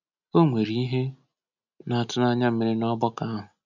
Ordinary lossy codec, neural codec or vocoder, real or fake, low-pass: none; none; real; 7.2 kHz